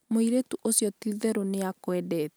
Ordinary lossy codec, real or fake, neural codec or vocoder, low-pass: none; real; none; none